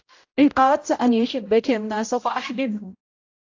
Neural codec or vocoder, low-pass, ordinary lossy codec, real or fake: codec, 16 kHz, 0.5 kbps, X-Codec, HuBERT features, trained on general audio; 7.2 kHz; AAC, 48 kbps; fake